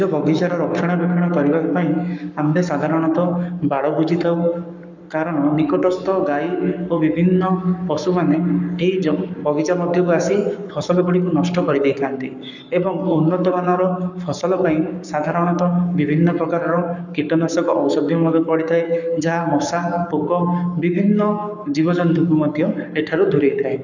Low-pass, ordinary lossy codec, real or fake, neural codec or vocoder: 7.2 kHz; none; fake; codec, 44.1 kHz, 7.8 kbps, Pupu-Codec